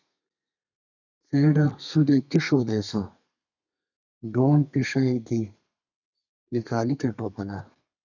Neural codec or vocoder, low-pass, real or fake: codec, 32 kHz, 1.9 kbps, SNAC; 7.2 kHz; fake